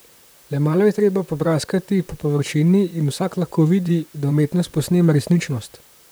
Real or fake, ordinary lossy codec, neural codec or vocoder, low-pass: fake; none; vocoder, 44.1 kHz, 128 mel bands, Pupu-Vocoder; none